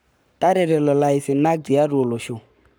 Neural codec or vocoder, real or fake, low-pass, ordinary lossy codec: codec, 44.1 kHz, 7.8 kbps, Pupu-Codec; fake; none; none